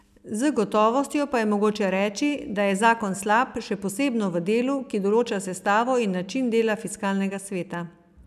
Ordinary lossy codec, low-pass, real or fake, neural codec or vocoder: none; 14.4 kHz; real; none